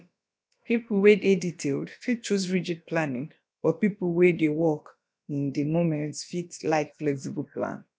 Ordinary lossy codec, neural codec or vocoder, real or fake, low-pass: none; codec, 16 kHz, about 1 kbps, DyCAST, with the encoder's durations; fake; none